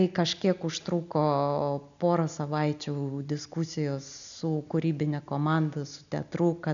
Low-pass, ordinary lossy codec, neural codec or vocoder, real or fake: 7.2 kHz; MP3, 96 kbps; none; real